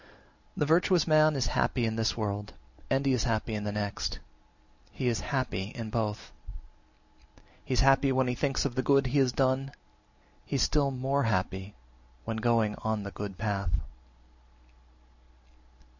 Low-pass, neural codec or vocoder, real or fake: 7.2 kHz; none; real